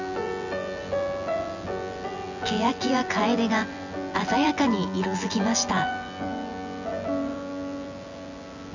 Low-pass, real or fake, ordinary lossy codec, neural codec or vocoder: 7.2 kHz; fake; none; vocoder, 24 kHz, 100 mel bands, Vocos